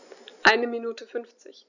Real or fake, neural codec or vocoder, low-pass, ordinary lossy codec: real; none; 7.2 kHz; none